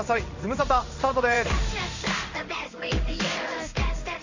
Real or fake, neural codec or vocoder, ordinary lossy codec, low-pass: fake; codec, 16 kHz in and 24 kHz out, 1 kbps, XY-Tokenizer; Opus, 64 kbps; 7.2 kHz